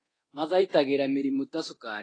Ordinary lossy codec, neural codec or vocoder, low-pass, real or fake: AAC, 32 kbps; codec, 24 kHz, 0.9 kbps, DualCodec; 9.9 kHz; fake